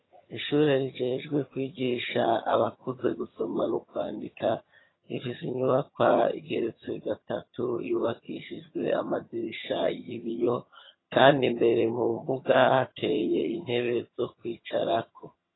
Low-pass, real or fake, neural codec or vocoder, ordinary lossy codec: 7.2 kHz; fake; vocoder, 22.05 kHz, 80 mel bands, HiFi-GAN; AAC, 16 kbps